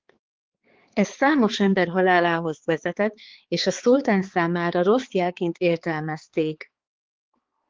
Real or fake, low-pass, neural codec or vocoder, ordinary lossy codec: fake; 7.2 kHz; codec, 16 kHz, 4 kbps, X-Codec, HuBERT features, trained on balanced general audio; Opus, 16 kbps